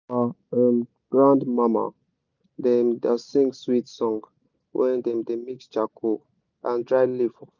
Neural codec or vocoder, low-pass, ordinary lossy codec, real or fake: none; 7.2 kHz; none; real